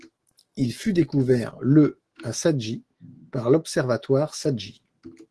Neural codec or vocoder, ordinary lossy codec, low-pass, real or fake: autoencoder, 48 kHz, 128 numbers a frame, DAC-VAE, trained on Japanese speech; Opus, 16 kbps; 10.8 kHz; fake